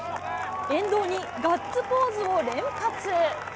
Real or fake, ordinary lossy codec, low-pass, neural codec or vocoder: real; none; none; none